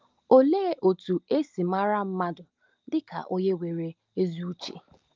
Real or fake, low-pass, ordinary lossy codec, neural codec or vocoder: real; 7.2 kHz; Opus, 24 kbps; none